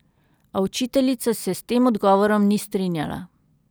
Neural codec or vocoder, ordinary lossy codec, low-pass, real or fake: none; none; none; real